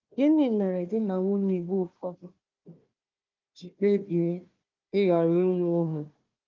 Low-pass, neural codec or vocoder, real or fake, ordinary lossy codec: 7.2 kHz; codec, 16 kHz, 1 kbps, FunCodec, trained on Chinese and English, 50 frames a second; fake; Opus, 24 kbps